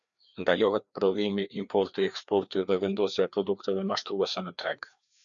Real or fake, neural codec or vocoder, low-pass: fake; codec, 16 kHz, 2 kbps, FreqCodec, larger model; 7.2 kHz